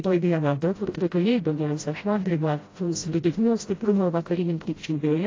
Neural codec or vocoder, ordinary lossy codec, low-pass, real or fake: codec, 16 kHz, 0.5 kbps, FreqCodec, smaller model; AAC, 32 kbps; 7.2 kHz; fake